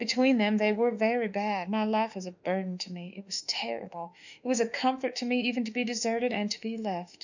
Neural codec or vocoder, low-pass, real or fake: autoencoder, 48 kHz, 32 numbers a frame, DAC-VAE, trained on Japanese speech; 7.2 kHz; fake